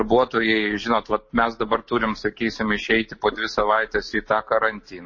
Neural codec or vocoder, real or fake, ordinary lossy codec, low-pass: none; real; MP3, 32 kbps; 7.2 kHz